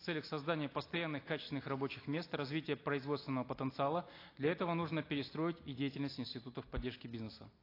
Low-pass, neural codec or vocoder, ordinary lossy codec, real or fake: 5.4 kHz; none; AAC, 32 kbps; real